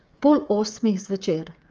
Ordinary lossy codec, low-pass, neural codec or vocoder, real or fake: Opus, 24 kbps; 7.2 kHz; codec, 16 kHz, 16 kbps, FreqCodec, smaller model; fake